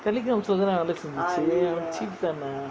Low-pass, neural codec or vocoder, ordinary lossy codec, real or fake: none; none; none; real